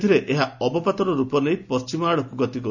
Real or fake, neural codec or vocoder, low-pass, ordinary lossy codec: real; none; 7.2 kHz; none